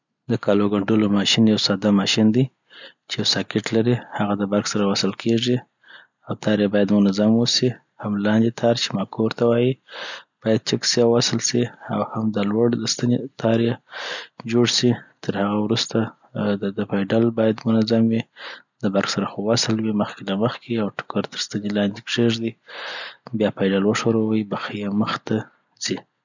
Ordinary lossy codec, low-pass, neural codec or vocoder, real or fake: none; 7.2 kHz; none; real